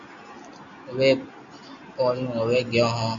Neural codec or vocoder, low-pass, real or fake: none; 7.2 kHz; real